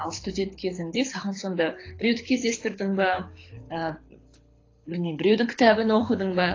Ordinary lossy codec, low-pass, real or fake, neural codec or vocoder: AAC, 32 kbps; 7.2 kHz; fake; codec, 24 kHz, 6 kbps, HILCodec